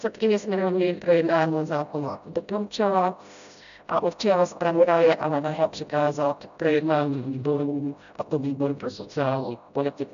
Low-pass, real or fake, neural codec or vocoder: 7.2 kHz; fake; codec, 16 kHz, 0.5 kbps, FreqCodec, smaller model